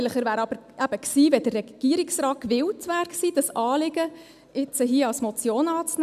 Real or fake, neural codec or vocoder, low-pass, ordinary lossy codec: real; none; 14.4 kHz; none